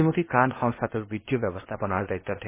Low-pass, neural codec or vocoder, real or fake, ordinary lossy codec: 3.6 kHz; codec, 16 kHz, 0.8 kbps, ZipCodec; fake; MP3, 16 kbps